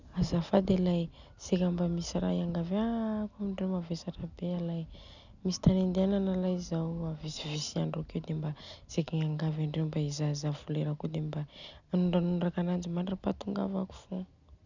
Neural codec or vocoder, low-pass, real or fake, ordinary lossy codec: none; 7.2 kHz; real; none